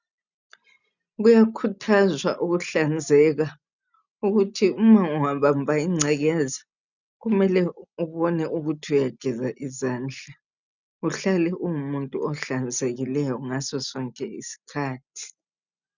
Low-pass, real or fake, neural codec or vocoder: 7.2 kHz; real; none